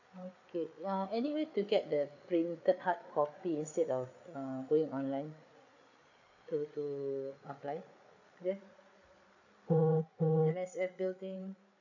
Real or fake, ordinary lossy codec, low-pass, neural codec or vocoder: fake; none; 7.2 kHz; codec, 16 kHz, 8 kbps, FreqCodec, larger model